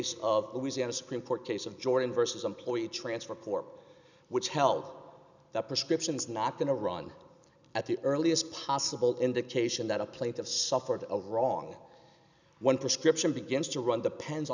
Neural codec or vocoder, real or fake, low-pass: none; real; 7.2 kHz